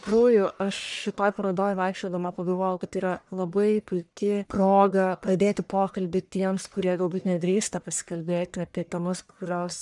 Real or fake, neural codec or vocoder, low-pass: fake; codec, 44.1 kHz, 1.7 kbps, Pupu-Codec; 10.8 kHz